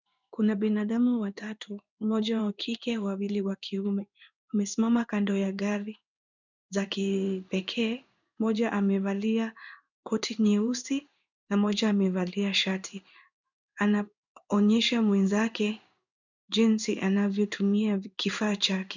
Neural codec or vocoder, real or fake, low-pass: codec, 16 kHz in and 24 kHz out, 1 kbps, XY-Tokenizer; fake; 7.2 kHz